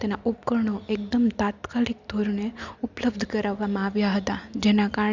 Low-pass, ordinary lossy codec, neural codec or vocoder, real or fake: 7.2 kHz; Opus, 64 kbps; none; real